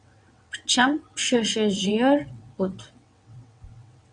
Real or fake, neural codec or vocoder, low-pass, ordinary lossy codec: fake; vocoder, 22.05 kHz, 80 mel bands, WaveNeXt; 9.9 kHz; MP3, 96 kbps